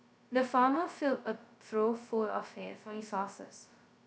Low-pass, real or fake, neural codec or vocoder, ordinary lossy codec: none; fake; codec, 16 kHz, 0.2 kbps, FocalCodec; none